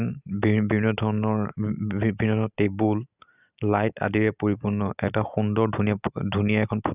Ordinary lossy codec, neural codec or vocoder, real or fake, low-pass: none; none; real; 3.6 kHz